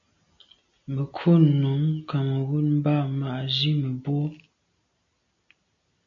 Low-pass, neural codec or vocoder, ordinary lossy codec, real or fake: 7.2 kHz; none; MP3, 64 kbps; real